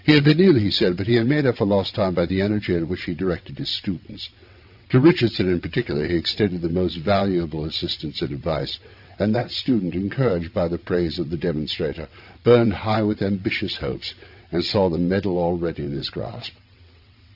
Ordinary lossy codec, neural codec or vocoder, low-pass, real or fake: AAC, 48 kbps; vocoder, 22.05 kHz, 80 mel bands, WaveNeXt; 5.4 kHz; fake